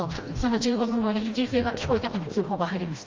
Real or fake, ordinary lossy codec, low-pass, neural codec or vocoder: fake; Opus, 32 kbps; 7.2 kHz; codec, 16 kHz, 1 kbps, FreqCodec, smaller model